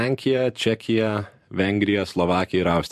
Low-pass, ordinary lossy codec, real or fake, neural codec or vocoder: 14.4 kHz; MP3, 64 kbps; fake; vocoder, 44.1 kHz, 128 mel bands every 512 samples, BigVGAN v2